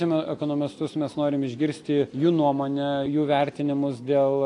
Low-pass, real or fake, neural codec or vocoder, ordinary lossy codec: 10.8 kHz; real; none; AAC, 48 kbps